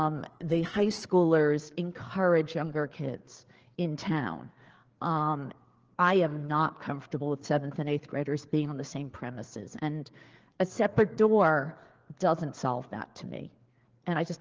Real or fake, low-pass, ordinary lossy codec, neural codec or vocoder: fake; 7.2 kHz; Opus, 16 kbps; codec, 16 kHz, 4 kbps, FreqCodec, larger model